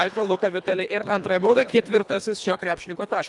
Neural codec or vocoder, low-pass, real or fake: codec, 24 kHz, 1.5 kbps, HILCodec; 10.8 kHz; fake